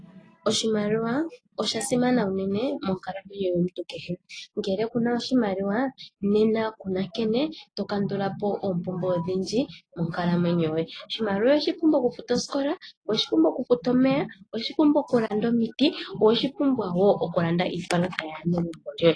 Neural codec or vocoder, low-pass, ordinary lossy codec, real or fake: none; 9.9 kHz; AAC, 32 kbps; real